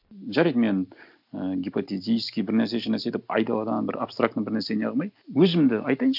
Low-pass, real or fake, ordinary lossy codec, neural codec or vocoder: 5.4 kHz; real; none; none